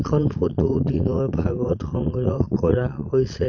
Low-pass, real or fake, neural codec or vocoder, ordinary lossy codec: 7.2 kHz; fake; vocoder, 22.05 kHz, 80 mel bands, Vocos; Opus, 64 kbps